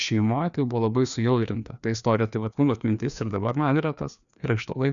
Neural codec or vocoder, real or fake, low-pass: codec, 16 kHz, 2 kbps, FreqCodec, larger model; fake; 7.2 kHz